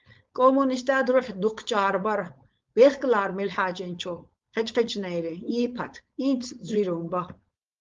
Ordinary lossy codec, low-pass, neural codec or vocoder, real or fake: Opus, 32 kbps; 7.2 kHz; codec, 16 kHz, 4.8 kbps, FACodec; fake